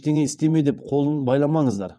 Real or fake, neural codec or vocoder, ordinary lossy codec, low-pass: fake; vocoder, 22.05 kHz, 80 mel bands, WaveNeXt; none; none